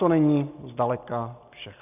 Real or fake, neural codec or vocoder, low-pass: real; none; 3.6 kHz